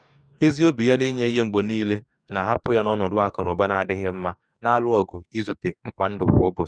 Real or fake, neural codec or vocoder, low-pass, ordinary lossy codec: fake; codec, 44.1 kHz, 2.6 kbps, DAC; 9.9 kHz; none